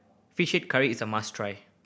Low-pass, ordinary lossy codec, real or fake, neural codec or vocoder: none; none; real; none